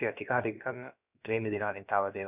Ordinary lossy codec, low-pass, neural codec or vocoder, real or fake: none; 3.6 kHz; codec, 16 kHz, about 1 kbps, DyCAST, with the encoder's durations; fake